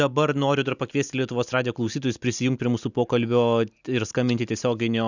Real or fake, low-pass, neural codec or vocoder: real; 7.2 kHz; none